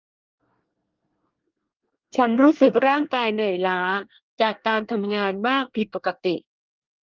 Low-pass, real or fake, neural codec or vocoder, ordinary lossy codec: 7.2 kHz; fake; codec, 24 kHz, 1 kbps, SNAC; Opus, 32 kbps